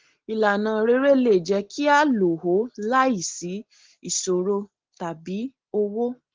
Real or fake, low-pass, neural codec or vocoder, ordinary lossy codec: real; 7.2 kHz; none; Opus, 16 kbps